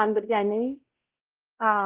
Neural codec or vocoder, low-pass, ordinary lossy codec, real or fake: codec, 16 kHz, 0.5 kbps, X-Codec, WavLM features, trained on Multilingual LibriSpeech; 3.6 kHz; Opus, 16 kbps; fake